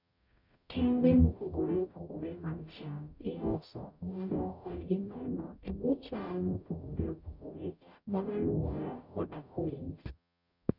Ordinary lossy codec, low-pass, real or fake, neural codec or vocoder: none; 5.4 kHz; fake; codec, 44.1 kHz, 0.9 kbps, DAC